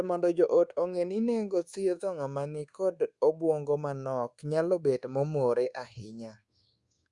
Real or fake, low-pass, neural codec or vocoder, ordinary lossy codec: fake; 10.8 kHz; codec, 24 kHz, 1.2 kbps, DualCodec; Opus, 64 kbps